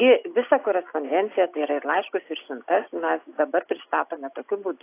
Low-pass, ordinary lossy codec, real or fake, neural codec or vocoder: 3.6 kHz; AAC, 24 kbps; real; none